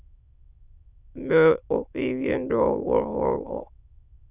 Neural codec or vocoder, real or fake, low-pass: autoencoder, 22.05 kHz, a latent of 192 numbers a frame, VITS, trained on many speakers; fake; 3.6 kHz